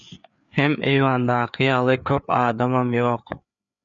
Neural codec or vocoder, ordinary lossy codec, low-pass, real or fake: codec, 16 kHz, 4 kbps, FreqCodec, larger model; MP3, 96 kbps; 7.2 kHz; fake